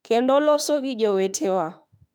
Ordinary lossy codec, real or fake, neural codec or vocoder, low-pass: none; fake; autoencoder, 48 kHz, 32 numbers a frame, DAC-VAE, trained on Japanese speech; 19.8 kHz